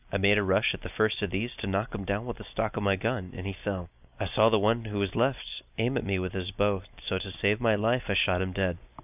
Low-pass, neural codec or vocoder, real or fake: 3.6 kHz; none; real